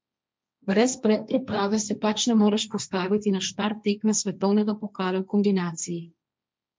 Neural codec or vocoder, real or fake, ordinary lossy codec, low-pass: codec, 16 kHz, 1.1 kbps, Voila-Tokenizer; fake; none; none